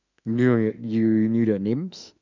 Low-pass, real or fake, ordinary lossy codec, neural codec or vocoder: 7.2 kHz; fake; none; autoencoder, 48 kHz, 32 numbers a frame, DAC-VAE, trained on Japanese speech